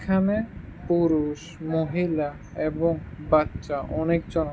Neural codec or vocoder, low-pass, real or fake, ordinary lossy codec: none; none; real; none